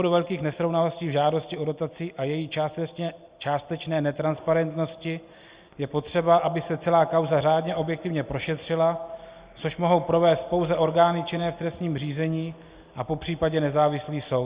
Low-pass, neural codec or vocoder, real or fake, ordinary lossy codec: 3.6 kHz; none; real; Opus, 64 kbps